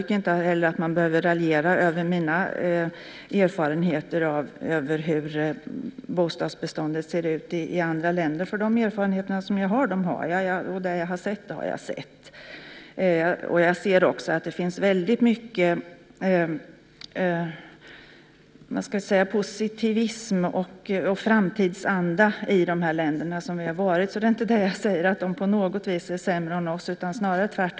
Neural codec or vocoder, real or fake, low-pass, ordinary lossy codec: none; real; none; none